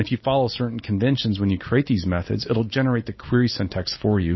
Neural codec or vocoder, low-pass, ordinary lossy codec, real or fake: none; 7.2 kHz; MP3, 24 kbps; real